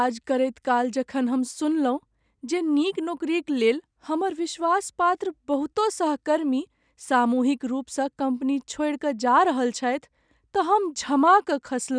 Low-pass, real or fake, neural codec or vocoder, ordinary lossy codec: 9.9 kHz; real; none; none